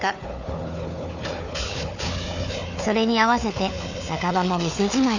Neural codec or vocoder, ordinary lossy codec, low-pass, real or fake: codec, 16 kHz, 4 kbps, FunCodec, trained on Chinese and English, 50 frames a second; none; 7.2 kHz; fake